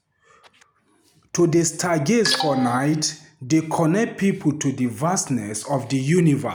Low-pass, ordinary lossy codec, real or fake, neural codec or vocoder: none; none; real; none